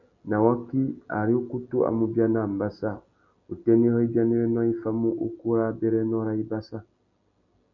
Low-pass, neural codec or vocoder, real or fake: 7.2 kHz; none; real